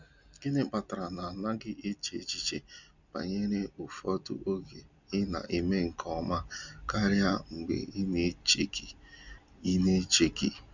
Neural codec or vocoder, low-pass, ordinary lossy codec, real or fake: none; 7.2 kHz; none; real